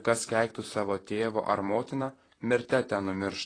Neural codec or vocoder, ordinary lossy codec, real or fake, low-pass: vocoder, 44.1 kHz, 128 mel bands, Pupu-Vocoder; AAC, 32 kbps; fake; 9.9 kHz